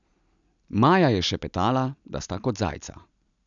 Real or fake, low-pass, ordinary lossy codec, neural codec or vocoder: real; 7.2 kHz; none; none